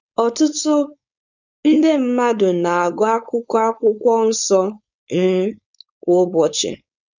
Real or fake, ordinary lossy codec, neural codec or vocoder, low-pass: fake; none; codec, 16 kHz, 4.8 kbps, FACodec; 7.2 kHz